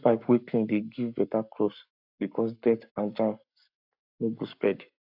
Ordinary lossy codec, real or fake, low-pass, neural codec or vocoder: AAC, 48 kbps; fake; 5.4 kHz; codec, 44.1 kHz, 7.8 kbps, Pupu-Codec